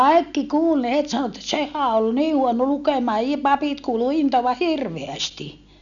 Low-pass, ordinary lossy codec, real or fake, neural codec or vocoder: 7.2 kHz; none; real; none